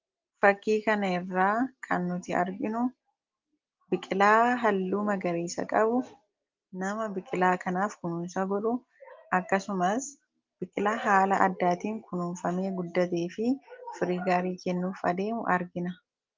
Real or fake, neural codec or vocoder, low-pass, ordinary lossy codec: real; none; 7.2 kHz; Opus, 24 kbps